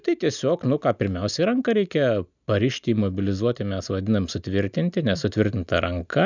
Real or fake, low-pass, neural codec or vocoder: real; 7.2 kHz; none